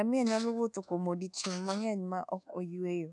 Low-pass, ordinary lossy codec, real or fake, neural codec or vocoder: 10.8 kHz; none; fake; codec, 24 kHz, 1.2 kbps, DualCodec